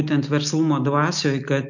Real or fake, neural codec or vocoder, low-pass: real; none; 7.2 kHz